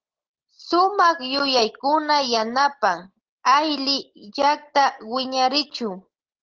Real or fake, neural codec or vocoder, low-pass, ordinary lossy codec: real; none; 7.2 kHz; Opus, 16 kbps